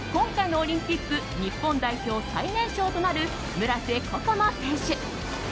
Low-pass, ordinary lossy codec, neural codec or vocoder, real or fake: none; none; none; real